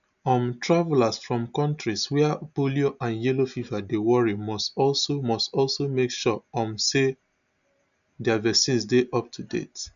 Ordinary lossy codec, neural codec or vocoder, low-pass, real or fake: none; none; 7.2 kHz; real